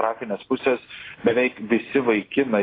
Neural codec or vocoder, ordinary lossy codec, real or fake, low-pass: none; AAC, 24 kbps; real; 5.4 kHz